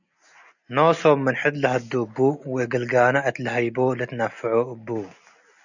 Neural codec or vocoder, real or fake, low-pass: none; real; 7.2 kHz